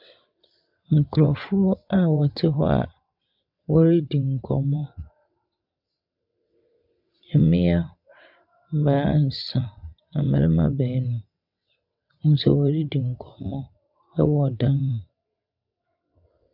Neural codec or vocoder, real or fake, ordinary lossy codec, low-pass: vocoder, 22.05 kHz, 80 mel bands, WaveNeXt; fake; MP3, 48 kbps; 5.4 kHz